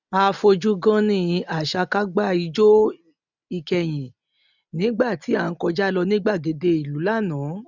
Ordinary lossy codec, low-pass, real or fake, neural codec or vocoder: none; 7.2 kHz; real; none